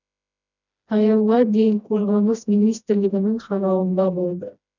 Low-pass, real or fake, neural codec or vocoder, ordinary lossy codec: 7.2 kHz; fake; codec, 16 kHz, 1 kbps, FreqCodec, smaller model; none